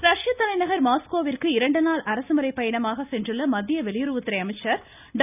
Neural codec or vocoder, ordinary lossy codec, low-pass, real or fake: none; none; 3.6 kHz; real